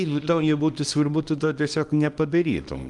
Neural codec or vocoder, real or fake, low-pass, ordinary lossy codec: codec, 24 kHz, 0.9 kbps, WavTokenizer, small release; fake; 10.8 kHz; Opus, 32 kbps